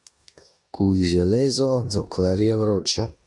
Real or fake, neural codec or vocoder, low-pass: fake; codec, 16 kHz in and 24 kHz out, 0.9 kbps, LongCat-Audio-Codec, four codebook decoder; 10.8 kHz